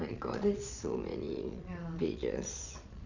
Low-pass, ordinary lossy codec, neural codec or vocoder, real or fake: 7.2 kHz; none; vocoder, 22.05 kHz, 80 mel bands, Vocos; fake